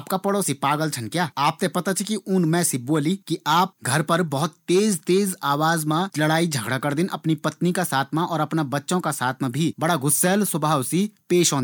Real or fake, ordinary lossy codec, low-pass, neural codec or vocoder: real; none; none; none